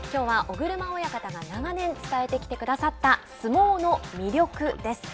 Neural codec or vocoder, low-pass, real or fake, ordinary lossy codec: none; none; real; none